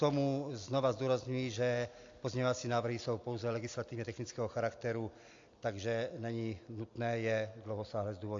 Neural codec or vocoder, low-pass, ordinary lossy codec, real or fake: none; 7.2 kHz; AAC, 48 kbps; real